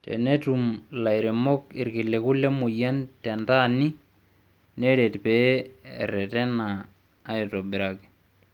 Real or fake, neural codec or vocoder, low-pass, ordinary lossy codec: real; none; 19.8 kHz; Opus, 32 kbps